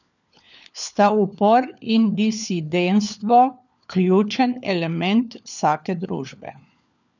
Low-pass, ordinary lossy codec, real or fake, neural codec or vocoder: 7.2 kHz; none; fake; codec, 16 kHz, 4 kbps, FunCodec, trained on LibriTTS, 50 frames a second